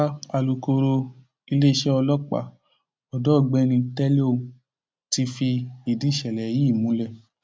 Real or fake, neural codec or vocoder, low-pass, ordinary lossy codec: real; none; none; none